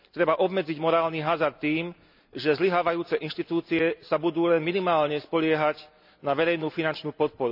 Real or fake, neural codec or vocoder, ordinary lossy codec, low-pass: real; none; none; 5.4 kHz